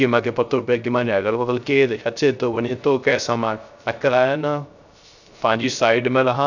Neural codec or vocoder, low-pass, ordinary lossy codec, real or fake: codec, 16 kHz, 0.3 kbps, FocalCodec; 7.2 kHz; none; fake